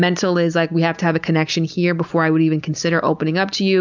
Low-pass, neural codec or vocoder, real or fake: 7.2 kHz; none; real